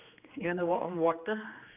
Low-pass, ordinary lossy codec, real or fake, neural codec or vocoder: 3.6 kHz; none; fake; codec, 16 kHz, 2 kbps, X-Codec, HuBERT features, trained on general audio